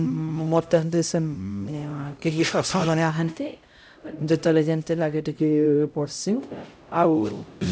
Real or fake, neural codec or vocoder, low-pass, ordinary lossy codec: fake; codec, 16 kHz, 0.5 kbps, X-Codec, HuBERT features, trained on LibriSpeech; none; none